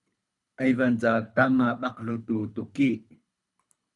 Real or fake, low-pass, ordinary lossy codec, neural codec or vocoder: fake; 10.8 kHz; MP3, 96 kbps; codec, 24 kHz, 3 kbps, HILCodec